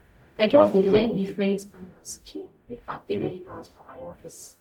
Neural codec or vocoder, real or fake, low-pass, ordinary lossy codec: codec, 44.1 kHz, 0.9 kbps, DAC; fake; 19.8 kHz; none